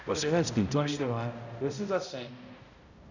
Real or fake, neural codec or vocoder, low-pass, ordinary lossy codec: fake; codec, 16 kHz, 0.5 kbps, X-Codec, HuBERT features, trained on balanced general audio; 7.2 kHz; none